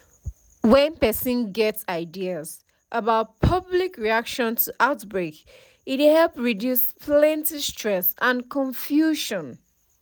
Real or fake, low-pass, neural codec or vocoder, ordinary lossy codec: real; none; none; none